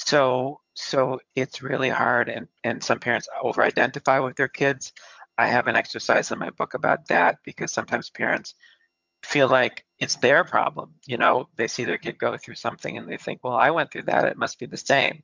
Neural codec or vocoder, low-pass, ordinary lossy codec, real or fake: vocoder, 22.05 kHz, 80 mel bands, HiFi-GAN; 7.2 kHz; MP3, 64 kbps; fake